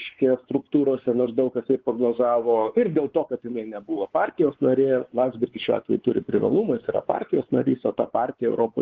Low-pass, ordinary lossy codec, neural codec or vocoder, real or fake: 7.2 kHz; Opus, 16 kbps; codec, 16 kHz, 4 kbps, X-Codec, WavLM features, trained on Multilingual LibriSpeech; fake